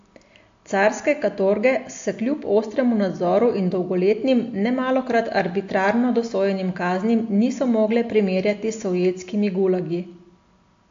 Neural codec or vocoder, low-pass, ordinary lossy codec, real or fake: none; 7.2 kHz; AAC, 48 kbps; real